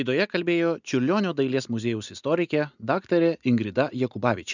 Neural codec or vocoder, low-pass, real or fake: none; 7.2 kHz; real